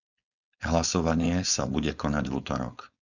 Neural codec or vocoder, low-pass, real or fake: codec, 16 kHz, 4.8 kbps, FACodec; 7.2 kHz; fake